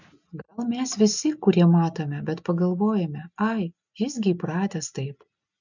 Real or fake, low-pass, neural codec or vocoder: real; 7.2 kHz; none